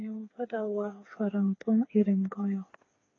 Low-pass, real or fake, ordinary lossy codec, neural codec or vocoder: 7.2 kHz; fake; AAC, 32 kbps; codec, 16 kHz, 4 kbps, FreqCodec, smaller model